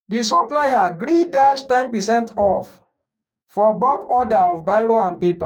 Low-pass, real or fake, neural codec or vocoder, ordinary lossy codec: 19.8 kHz; fake; codec, 44.1 kHz, 2.6 kbps, DAC; none